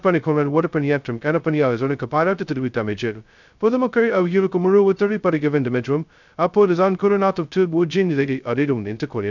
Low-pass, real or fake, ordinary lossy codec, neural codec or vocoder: 7.2 kHz; fake; none; codec, 16 kHz, 0.2 kbps, FocalCodec